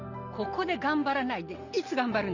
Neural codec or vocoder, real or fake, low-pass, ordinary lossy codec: none; real; 7.2 kHz; none